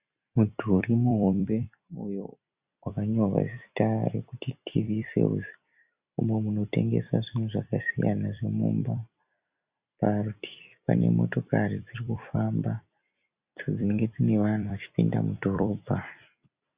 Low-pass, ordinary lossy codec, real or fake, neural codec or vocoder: 3.6 kHz; Opus, 64 kbps; fake; vocoder, 44.1 kHz, 128 mel bands every 256 samples, BigVGAN v2